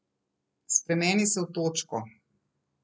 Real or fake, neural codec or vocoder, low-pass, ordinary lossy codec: real; none; none; none